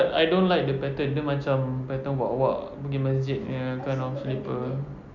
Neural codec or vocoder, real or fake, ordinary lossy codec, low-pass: none; real; none; 7.2 kHz